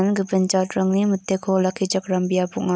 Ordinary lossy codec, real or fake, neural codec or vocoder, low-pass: none; real; none; none